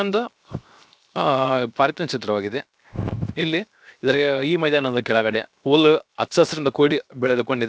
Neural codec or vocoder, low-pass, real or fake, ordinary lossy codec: codec, 16 kHz, 0.7 kbps, FocalCodec; none; fake; none